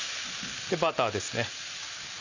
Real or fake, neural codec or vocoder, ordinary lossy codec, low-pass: real; none; none; 7.2 kHz